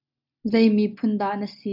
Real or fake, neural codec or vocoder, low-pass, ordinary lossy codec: real; none; 5.4 kHz; AAC, 48 kbps